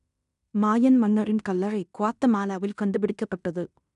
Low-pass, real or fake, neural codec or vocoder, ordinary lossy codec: 10.8 kHz; fake; codec, 16 kHz in and 24 kHz out, 0.9 kbps, LongCat-Audio-Codec, fine tuned four codebook decoder; none